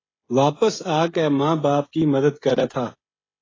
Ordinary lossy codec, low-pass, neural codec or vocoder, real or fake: AAC, 32 kbps; 7.2 kHz; codec, 16 kHz, 16 kbps, FreqCodec, smaller model; fake